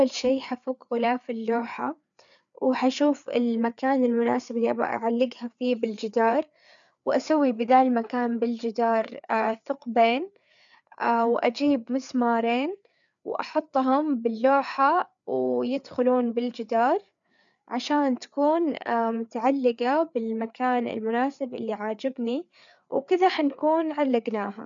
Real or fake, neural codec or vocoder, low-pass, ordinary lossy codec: fake; codec, 16 kHz, 4 kbps, FreqCodec, larger model; 7.2 kHz; none